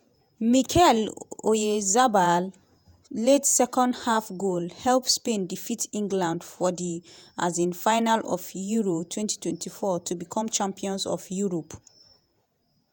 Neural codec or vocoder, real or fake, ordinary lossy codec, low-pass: vocoder, 48 kHz, 128 mel bands, Vocos; fake; none; none